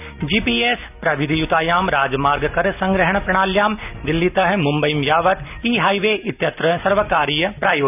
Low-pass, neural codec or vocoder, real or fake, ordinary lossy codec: 3.6 kHz; none; real; none